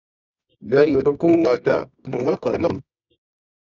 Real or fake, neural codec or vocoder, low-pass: fake; codec, 24 kHz, 0.9 kbps, WavTokenizer, medium music audio release; 7.2 kHz